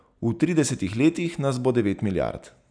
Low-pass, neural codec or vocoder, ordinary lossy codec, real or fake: 9.9 kHz; none; none; real